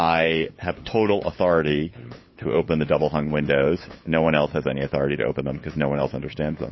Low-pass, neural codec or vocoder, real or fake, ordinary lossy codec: 7.2 kHz; codec, 16 kHz, 8 kbps, FunCodec, trained on LibriTTS, 25 frames a second; fake; MP3, 24 kbps